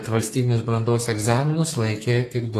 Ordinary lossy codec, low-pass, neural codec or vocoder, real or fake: AAC, 48 kbps; 14.4 kHz; codec, 32 kHz, 1.9 kbps, SNAC; fake